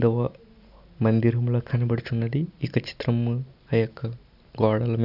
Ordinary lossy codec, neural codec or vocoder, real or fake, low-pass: none; none; real; 5.4 kHz